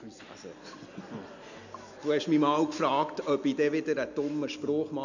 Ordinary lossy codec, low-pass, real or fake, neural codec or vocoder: none; 7.2 kHz; real; none